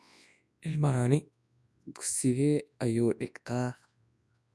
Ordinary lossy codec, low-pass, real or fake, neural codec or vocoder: none; none; fake; codec, 24 kHz, 0.9 kbps, WavTokenizer, large speech release